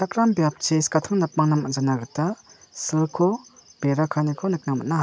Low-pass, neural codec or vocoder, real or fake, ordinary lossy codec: none; none; real; none